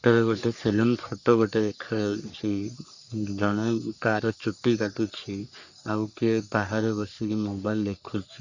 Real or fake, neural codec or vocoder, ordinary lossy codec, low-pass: fake; codec, 44.1 kHz, 3.4 kbps, Pupu-Codec; Opus, 64 kbps; 7.2 kHz